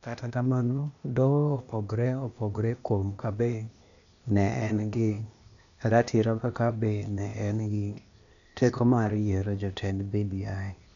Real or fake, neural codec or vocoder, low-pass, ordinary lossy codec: fake; codec, 16 kHz, 0.8 kbps, ZipCodec; 7.2 kHz; none